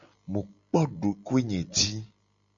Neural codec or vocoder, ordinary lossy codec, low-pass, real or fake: none; MP3, 96 kbps; 7.2 kHz; real